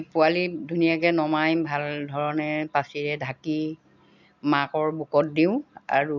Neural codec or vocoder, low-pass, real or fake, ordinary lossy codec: none; 7.2 kHz; real; none